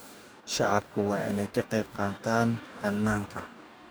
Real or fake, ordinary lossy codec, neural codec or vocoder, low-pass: fake; none; codec, 44.1 kHz, 2.6 kbps, DAC; none